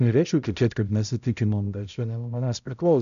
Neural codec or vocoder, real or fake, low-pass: codec, 16 kHz, 0.5 kbps, X-Codec, HuBERT features, trained on balanced general audio; fake; 7.2 kHz